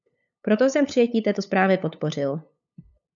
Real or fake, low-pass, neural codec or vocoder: fake; 7.2 kHz; codec, 16 kHz, 8 kbps, FunCodec, trained on LibriTTS, 25 frames a second